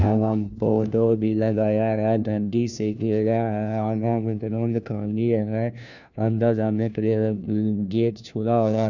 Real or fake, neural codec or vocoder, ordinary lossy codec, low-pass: fake; codec, 16 kHz, 1 kbps, FunCodec, trained on LibriTTS, 50 frames a second; none; 7.2 kHz